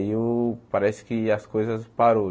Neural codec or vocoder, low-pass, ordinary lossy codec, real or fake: none; none; none; real